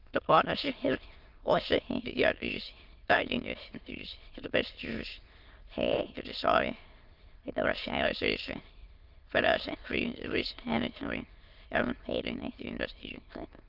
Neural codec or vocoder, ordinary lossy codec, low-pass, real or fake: autoencoder, 22.05 kHz, a latent of 192 numbers a frame, VITS, trained on many speakers; Opus, 32 kbps; 5.4 kHz; fake